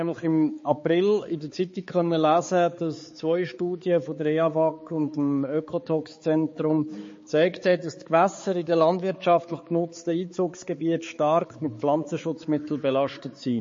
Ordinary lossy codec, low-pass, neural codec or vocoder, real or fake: MP3, 32 kbps; 7.2 kHz; codec, 16 kHz, 4 kbps, X-Codec, HuBERT features, trained on balanced general audio; fake